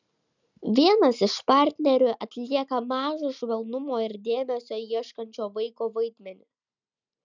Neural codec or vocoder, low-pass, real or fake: none; 7.2 kHz; real